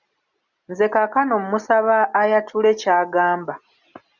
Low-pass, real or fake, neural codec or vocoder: 7.2 kHz; real; none